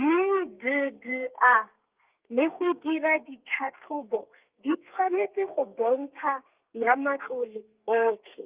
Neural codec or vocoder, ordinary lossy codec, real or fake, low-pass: codec, 32 kHz, 1.9 kbps, SNAC; Opus, 24 kbps; fake; 3.6 kHz